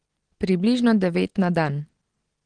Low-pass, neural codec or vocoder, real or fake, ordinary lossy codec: 9.9 kHz; none; real; Opus, 16 kbps